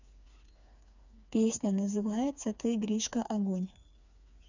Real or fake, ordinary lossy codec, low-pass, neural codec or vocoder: fake; none; 7.2 kHz; codec, 16 kHz, 4 kbps, FreqCodec, smaller model